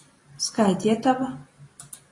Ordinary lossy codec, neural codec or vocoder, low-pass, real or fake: AAC, 32 kbps; none; 10.8 kHz; real